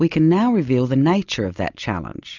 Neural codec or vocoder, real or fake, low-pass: none; real; 7.2 kHz